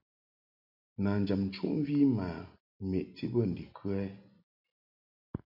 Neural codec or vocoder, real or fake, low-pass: none; real; 5.4 kHz